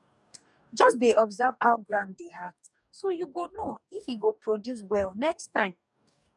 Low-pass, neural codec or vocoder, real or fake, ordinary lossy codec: 10.8 kHz; codec, 44.1 kHz, 2.6 kbps, DAC; fake; none